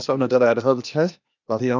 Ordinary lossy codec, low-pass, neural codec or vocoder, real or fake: none; 7.2 kHz; codec, 16 kHz, 0.8 kbps, ZipCodec; fake